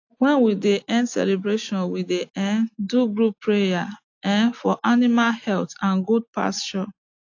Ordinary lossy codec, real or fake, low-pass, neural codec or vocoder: AAC, 48 kbps; real; 7.2 kHz; none